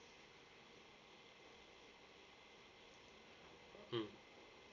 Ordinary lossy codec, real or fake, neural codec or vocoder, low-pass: none; real; none; 7.2 kHz